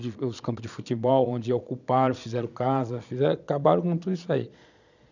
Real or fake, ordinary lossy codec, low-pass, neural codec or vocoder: fake; none; 7.2 kHz; vocoder, 22.05 kHz, 80 mel bands, Vocos